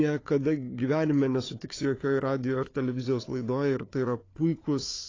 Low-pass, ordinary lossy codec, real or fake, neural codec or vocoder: 7.2 kHz; AAC, 32 kbps; fake; codec, 16 kHz, 4 kbps, FunCodec, trained on Chinese and English, 50 frames a second